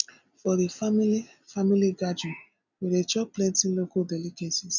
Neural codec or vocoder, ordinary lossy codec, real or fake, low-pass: none; none; real; 7.2 kHz